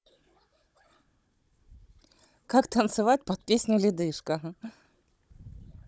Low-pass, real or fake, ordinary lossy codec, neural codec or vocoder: none; fake; none; codec, 16 kHz, 16 kbps, FunCodec, trained on Chinese and English, 50 frames a second